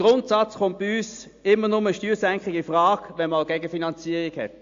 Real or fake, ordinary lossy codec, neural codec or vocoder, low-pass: real; AAC, 48 kbps; none; 7.2 kHz